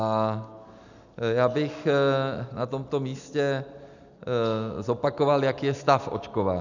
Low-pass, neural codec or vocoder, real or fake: 7.2 kHz; none; real